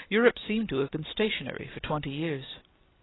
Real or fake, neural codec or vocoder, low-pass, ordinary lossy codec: real; none; 7.2 kHz; AAC, 16 kbps